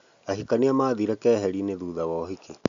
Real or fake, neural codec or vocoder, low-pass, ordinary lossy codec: real; none; 7.2 kHz; MP3, 64 kbps